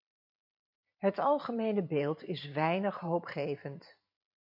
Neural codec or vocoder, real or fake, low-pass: vocoder, 44.1 kHz, 128 mel bands every 512 samples, BigVGAN v2; fake; 5.4 kHz